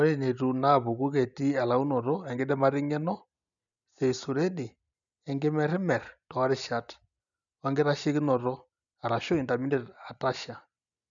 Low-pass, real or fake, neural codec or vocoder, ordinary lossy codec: 7.2 kHz; real; none; none